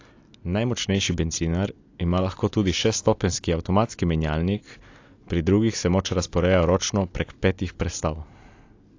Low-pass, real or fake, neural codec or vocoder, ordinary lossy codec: 7.2 kHz; real; none; AAC, 48 kbps